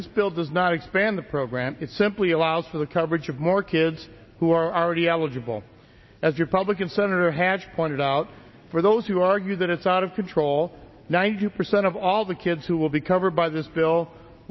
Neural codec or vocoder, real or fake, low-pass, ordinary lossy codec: none; real; 7.2 kHz; MP3, 24 kbps